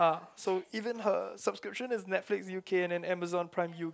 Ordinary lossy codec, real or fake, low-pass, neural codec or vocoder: none; real; none; none